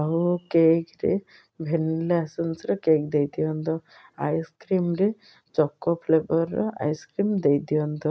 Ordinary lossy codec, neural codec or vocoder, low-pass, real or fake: none; none; none; real